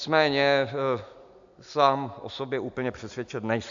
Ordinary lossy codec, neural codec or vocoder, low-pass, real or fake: AAC, 64 kbps; none; 7.2 kHz; real